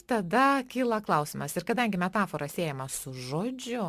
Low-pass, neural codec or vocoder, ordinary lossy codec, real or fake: 14.4 kHz; none; Opus, 64 kbps; real